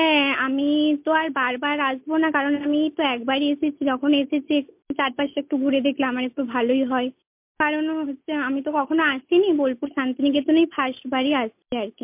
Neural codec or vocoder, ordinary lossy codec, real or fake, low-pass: none; MP3, 32 kbps; real; 3.6 kHz